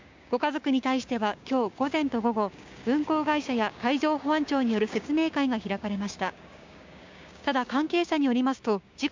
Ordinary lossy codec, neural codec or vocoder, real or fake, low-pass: MP3, 64 kbps; codec, 16 kHz, 6 kbps, DAC; fake; 7.2 kHz